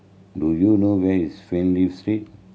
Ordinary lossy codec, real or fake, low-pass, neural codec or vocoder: none; real; none; none